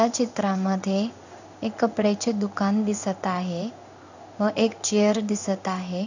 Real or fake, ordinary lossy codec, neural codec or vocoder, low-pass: fake; none; codec, 16 kHz in and 24 kHz out, 1 kbps, XY-Tokenizer; 7.2 kHz